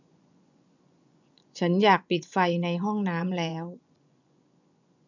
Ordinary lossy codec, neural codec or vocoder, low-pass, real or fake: none; vocoder, 24 kHz, 100 mel bands, Vocos; 7.2 kHz; fake